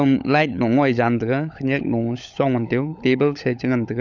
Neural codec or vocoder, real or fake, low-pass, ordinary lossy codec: codec, 16 kHz, 16 kbps, FunCodec, trained on LibriTTS, 50 frames a second; fake; 7.2 kHz; none